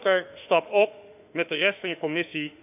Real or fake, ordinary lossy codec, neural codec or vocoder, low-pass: fake; none; autoencoder, 48 kHz, 32 numbers a frame, DAC-VAE, trained on Japanese speech; 3.6 kHz